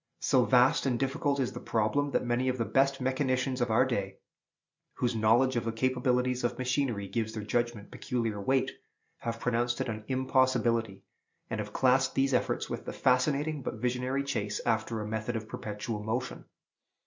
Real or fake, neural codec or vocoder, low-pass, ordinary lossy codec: real; none; 7.2 kHz; MP3, 64 kbps